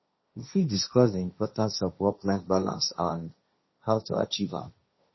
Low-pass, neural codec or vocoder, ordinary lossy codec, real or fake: 7.2 kHz; codec, 16 kHz, 1.1 kbps, Voila-Tokenizer; MP3, 24 kbps; fake